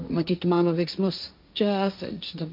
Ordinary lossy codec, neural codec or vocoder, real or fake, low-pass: MP3, 48 kbps; codec, 16 kHz, 1.1 kbps, Voila-Tokenizer; fake; 5.4 kHz